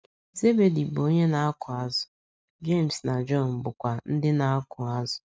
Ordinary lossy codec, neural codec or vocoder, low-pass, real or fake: none; none; none; real